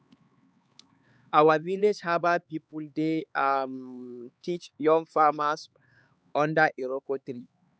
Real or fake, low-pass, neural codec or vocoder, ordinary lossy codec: fake; none; codec, 16 kHz, 4 kbps, X-Codec, HuBERT features, trained on LibriSpeech; none